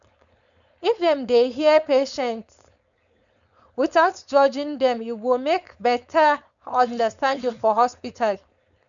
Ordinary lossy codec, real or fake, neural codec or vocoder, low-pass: none; fake; codec, 16 kHz, 4.8 kbps, FACodec; 7.2 kHz